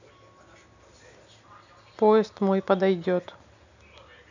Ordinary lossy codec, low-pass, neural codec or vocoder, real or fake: none; 7.2 kHz; none; real